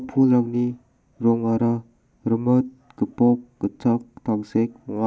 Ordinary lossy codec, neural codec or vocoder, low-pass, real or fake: none; none; none; real